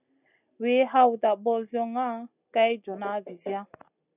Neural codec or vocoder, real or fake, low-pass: none; real; 3.6 kHz